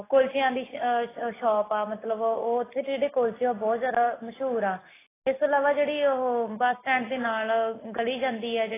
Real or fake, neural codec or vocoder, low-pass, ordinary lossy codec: real; none; 3.6 kHz; AAC, 16 kbps